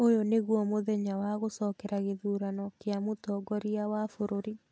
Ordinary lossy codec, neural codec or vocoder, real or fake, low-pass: none; none; real; none